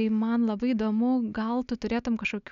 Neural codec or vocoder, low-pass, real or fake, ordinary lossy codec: none; 7.2 kHz; real; MP3, 96 kbps